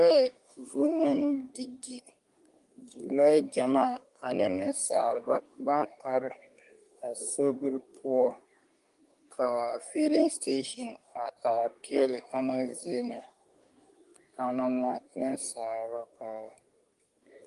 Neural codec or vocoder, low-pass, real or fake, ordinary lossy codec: codec, 24 kHz, 1 kbps, SNAC; 10.8 kHz; fake; Opus, 32 kbps